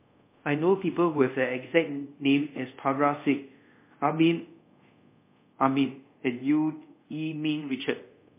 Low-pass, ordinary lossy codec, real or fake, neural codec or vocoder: 3.6 kHz; MP3, 24 kbps; fake; codec, 24 kHz, 0.5 kbps, DualCodec